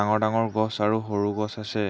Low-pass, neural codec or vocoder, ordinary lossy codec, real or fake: none; none; none; real